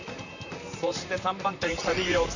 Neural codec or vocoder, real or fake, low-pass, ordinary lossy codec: vocoder, 44.1 kHz, 128 mel bands, Pupu-Vocoder; fake; 7.2 kHz; none